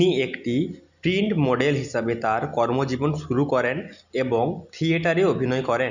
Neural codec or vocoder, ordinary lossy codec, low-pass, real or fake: none; none; 7.2 kHz; real